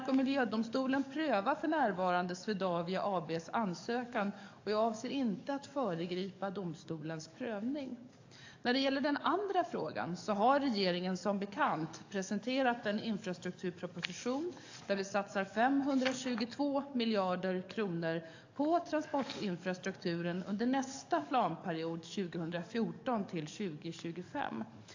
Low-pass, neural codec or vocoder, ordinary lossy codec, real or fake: 7.2 kHz; codec, 44.1 kHz, 7.8 kbps, DAC; AAC, 48 kbps; fake